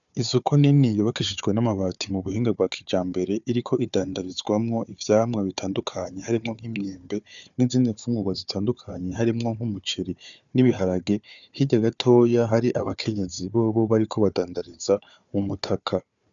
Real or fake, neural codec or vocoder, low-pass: fake; codec, 16 kHz, 4 kbps, FunCodec, trained on Chinese and English, 50 frames a second; 7.2 kHz